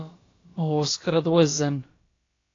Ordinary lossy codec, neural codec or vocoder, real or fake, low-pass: AAC, 32 kbps; codec, 16 kHz, about 1 kbps, DyCAST, with the encoder's durations; fake; 7.2 kHz